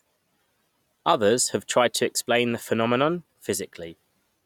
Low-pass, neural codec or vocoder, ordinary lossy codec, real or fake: 19.8 kHz; none; none; real